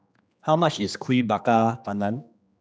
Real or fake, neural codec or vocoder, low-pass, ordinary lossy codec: fake; codec, 16 kHz, 2 kbps, X-Codec, HuBERT features, trained on general audio; none; none